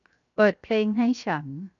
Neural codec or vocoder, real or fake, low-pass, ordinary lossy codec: codec, 16 kHz, 0.7 kbps, FocalCodec; fake; 7.2 kHz; none